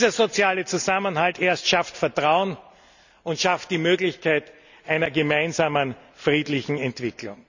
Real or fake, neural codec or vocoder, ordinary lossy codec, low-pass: real; none; none; 7.2 kHz